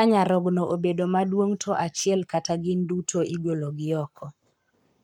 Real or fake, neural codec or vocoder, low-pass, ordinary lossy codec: fake; codec, 44.1 kHz, 7.8 kbps, Pupu-Codec; 19.8 kHz; none